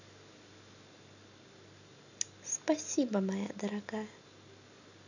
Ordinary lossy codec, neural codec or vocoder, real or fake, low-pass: none; none; real; 7.2 kHz